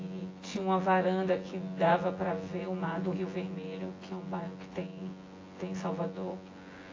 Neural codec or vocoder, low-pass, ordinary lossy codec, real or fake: vocoder, 24 kHz, 100 mel bands, Vocos; 7.2 kHz; AAC, 32 kbps; fake